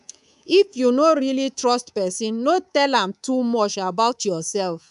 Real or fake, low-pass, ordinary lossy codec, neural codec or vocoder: fake; 10.8 kHz; none; codec, 24 kHz, 3.1 kbps, DualCodec